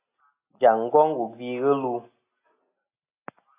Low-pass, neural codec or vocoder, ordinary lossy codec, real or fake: 3.6 kHz; none; AAC, 24 kbps; real